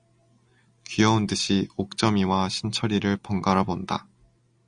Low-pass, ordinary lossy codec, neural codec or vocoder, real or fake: 9.9 kHz; Opus, 64 kbps; none; real